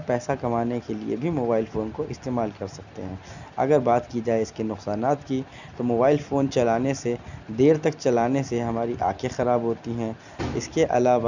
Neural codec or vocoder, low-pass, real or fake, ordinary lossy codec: none; 7.2 kHz; real; none